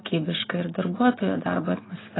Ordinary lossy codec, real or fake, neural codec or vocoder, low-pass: AAC, 16 kbps; real; none; 7.2 kHz